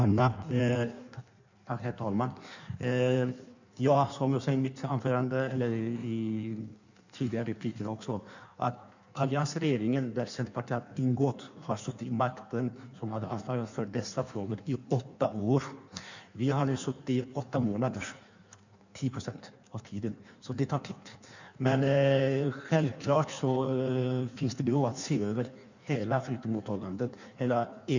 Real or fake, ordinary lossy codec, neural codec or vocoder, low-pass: fake; none; codec, 16 kHz in and 24 kHz out, 1.1 kbps, FireRedTTS-2 codec; 7.2 kHz